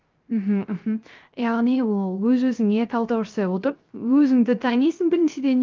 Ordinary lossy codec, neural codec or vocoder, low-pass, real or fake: Opus, 24 kbps; codec, 16 kHz, 0.3 kbps, FocalCodec; 7.2 kHz; fake